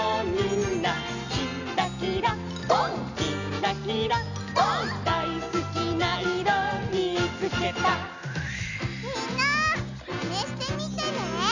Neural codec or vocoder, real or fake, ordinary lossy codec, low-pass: none; real; none; 7.2 kHz